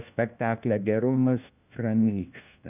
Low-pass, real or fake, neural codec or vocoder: 3.6 kHz; fake; codec, 16 kHz, 1 kbps, FunCodec, trained on LibriTTS, 50 frames a second